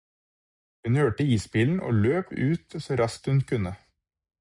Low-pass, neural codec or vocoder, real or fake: 10.8 kHz; none; real